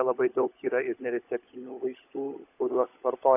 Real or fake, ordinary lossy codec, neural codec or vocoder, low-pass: fake; AAC, 24 kbps; codec, 16 kHz, 16 kbps, FunCodec, trained on LibriTTS, 50 frames a second; 3.6 kHz